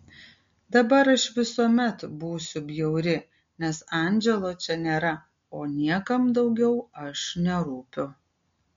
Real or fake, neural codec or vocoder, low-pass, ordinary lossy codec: real; none; 7.2 kHz; MP3, 48 kbps